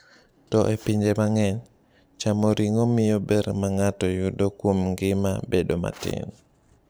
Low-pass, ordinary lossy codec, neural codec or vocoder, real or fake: none; none; vocoder, 44.1 kHz, 128 mel bands every 512 samples, BigVGAN v2; fake